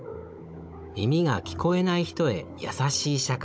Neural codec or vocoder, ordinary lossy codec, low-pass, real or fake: codec, 16 kHz, 16 kbps, FunCodec, trained on Chinese and English, 50 frames a second; none; none; fake